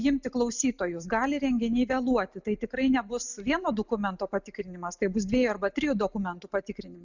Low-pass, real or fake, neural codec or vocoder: 7.2 kHz; real; none